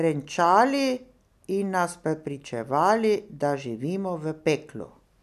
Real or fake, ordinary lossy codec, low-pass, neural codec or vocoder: real; none; 14.4 kHz; none